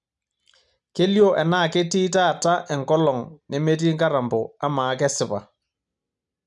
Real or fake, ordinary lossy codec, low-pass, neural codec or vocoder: real; none; 10.8 kHz; none